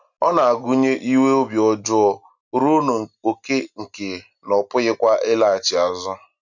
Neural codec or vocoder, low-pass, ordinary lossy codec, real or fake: none; 7.2 kHz; none; real